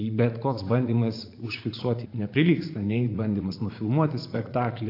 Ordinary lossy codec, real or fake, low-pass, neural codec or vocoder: AAC, 32 kbps; fake; 5.4 kHz; vocoder, 44.1 kHz, 80 mel bands, Vocos